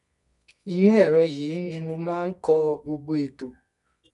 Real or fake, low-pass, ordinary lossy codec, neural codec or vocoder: fake; 10.8 kHz; none; codec, 24 kHz, 0.9 kbps, WavTokenizer, medium music audio release